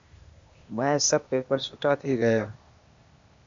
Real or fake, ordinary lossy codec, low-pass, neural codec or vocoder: fake; MP3, 64 kbps; 7.2 kHz; codec, 16 kHz, 0.8 kbps, ZipCodec